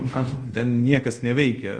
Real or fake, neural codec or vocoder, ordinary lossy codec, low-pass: fake; codec, 24 kHz, 0.5 kbps, DualCodec; Opus, 32 kbps; 9.9 kHz